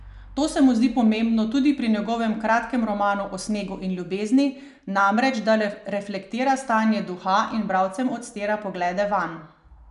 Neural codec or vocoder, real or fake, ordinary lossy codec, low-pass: none; real; none; 10.8 kHz